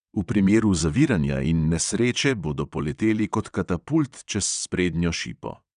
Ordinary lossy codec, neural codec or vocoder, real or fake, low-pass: none; vocoder, 22.05 kHz, 80 mel bands, WaveNeXt; fake; 9.9 kHz